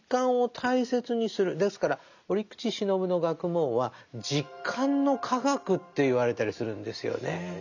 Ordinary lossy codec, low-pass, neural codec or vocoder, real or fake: none; 7.2 kHz; none; real